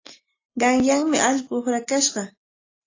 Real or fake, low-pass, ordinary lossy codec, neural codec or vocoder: real; 7.2 kHz; AAC, 32 kbps; none